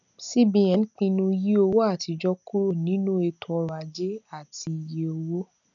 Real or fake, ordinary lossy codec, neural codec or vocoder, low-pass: real; none; none; 7.2 kHz